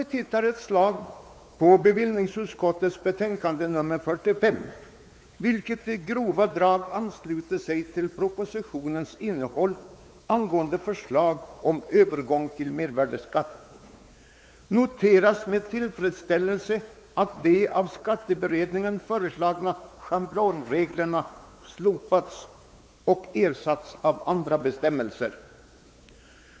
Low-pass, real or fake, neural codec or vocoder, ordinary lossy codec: none; fake; codec, 16 kHz, 4 kbps, X-Codec, WavLM features, trained on Multilingual LibriSpeech; none